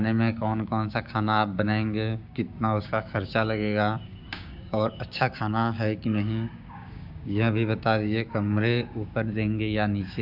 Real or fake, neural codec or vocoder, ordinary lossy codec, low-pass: fake; codec, 16 kHz, 6 kbps, DAC; AAC, 48 kbps; 5.4 kHz